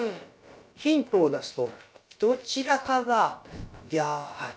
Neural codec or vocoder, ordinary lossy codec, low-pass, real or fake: codec, 16 kHz, about 1 kbps, DyCAST, with the encoder's durations; none; none; fake